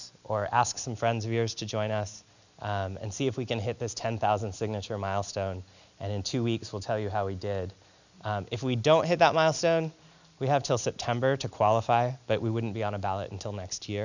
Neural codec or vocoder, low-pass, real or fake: none; 7.2 kHz; real